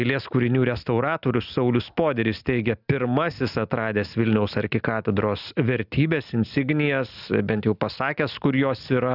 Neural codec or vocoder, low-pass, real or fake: none; 5.4 kHz; real